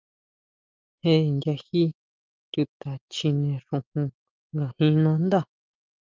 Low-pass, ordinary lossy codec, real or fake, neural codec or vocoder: 7.2 kHz; Opus, 24 kbps; real; none